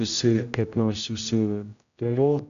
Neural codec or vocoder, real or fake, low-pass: codec, 16 kHz, 0.5 kbps, X-Codec, HuBERT features, trained on general audio; fake; 7.2 kHz